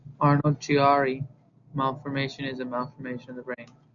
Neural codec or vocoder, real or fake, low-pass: none; real; 7.2 kHz